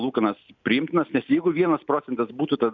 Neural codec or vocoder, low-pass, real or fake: none; 7.2 kHz; real